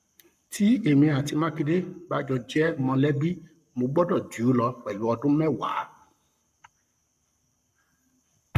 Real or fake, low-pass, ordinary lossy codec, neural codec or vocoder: fake; 14.4 kHz; none; codec, 44.1 kHz, 7.8 kbps, Pupu-Codec